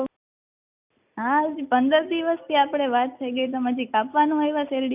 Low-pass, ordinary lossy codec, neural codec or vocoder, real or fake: 3.6 kHz; none; none; real